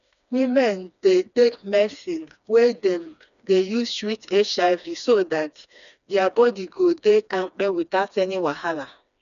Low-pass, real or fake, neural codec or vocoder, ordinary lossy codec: 7.2 kHz; fake; codec, 16 kHz, 2 kbps, FreqCodec, smaller model; none